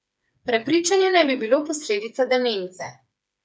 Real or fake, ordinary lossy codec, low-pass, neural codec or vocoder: fake; none; none; codec, 16 kHz, 4 kbps, FreqCodec, smaller model